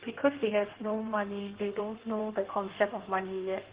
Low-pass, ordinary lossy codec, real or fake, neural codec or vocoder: 3.6 kHz; Opus, 16 kbps; fake; codec, 16 kHz in and 24 kHz out, 1.1 kbps, FireRedTTS-2 codec